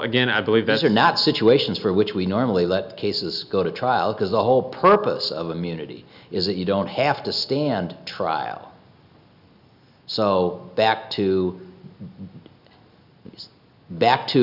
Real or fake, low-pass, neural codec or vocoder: real; 5.4 kHz; none